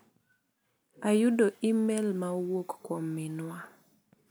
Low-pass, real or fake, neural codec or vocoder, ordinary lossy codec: none; real; none; none